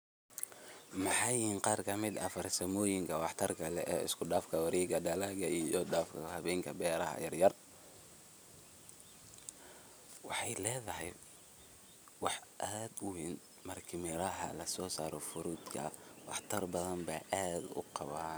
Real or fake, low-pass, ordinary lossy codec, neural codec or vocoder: real; none; none; none